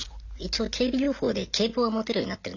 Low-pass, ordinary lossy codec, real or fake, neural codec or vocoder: 7.2 kHz; none; real; none